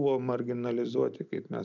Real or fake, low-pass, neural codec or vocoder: real; 7.2 kHz; none